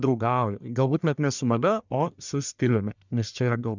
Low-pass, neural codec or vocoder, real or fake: 7.2 kHz; codec, 44.1 kHz, 1.7 kbps, Pupu-Codec; fake